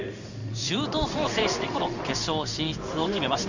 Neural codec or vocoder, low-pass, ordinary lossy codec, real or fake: codec, 16 kHz in and 24 kHz out, 1 kbps, XY-Tokenizer; 7.2 kHz; none; fake